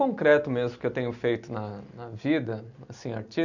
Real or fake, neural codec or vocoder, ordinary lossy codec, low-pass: real; none; none; 7.2 kHz